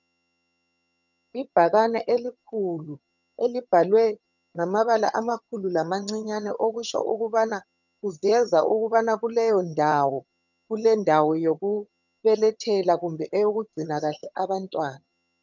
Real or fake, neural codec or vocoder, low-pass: fake; vocoder, 22.05 kHz, 80 mel bands, HiFi-GAN; 7.2 kHz